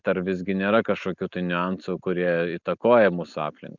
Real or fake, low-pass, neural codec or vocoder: real; 7.2 kHz; none